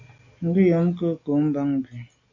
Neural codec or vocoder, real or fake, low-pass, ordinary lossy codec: none; real; 7.2 kHz; AAC, 48 kbps